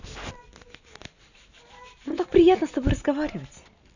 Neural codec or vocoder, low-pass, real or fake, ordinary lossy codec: none; 7.2 kHz; real; none